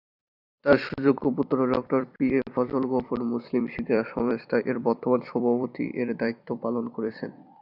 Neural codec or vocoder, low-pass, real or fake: vocoder, 44.1 kHz, 128 mel bands every 256 samples, BigVGAN v2; 5.4 kHz; fake